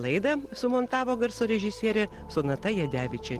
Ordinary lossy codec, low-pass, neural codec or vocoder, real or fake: Opus, 16 kbps; 14.4 kHz; none; real